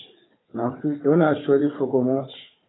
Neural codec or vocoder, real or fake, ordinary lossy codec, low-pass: codec, 16 kHz, 4 kbps, FunCodec, trained on Chinese and English, 50 frames a second; fake; AAC, 16 kbps; 7.2 kHz